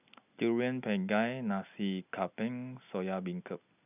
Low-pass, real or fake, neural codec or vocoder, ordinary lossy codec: 3.6 kHz; real; none; none